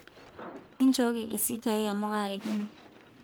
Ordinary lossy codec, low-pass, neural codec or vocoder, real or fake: none; none; codec, 44.1 kHz, 1.7 kbps, Pupu-Codec; fake